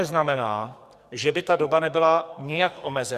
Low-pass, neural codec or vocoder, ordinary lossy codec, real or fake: 14.4 kHz; codec, 44.1 kHz, 2.6 kbps, SNAC; Opus, 64 kbps; fake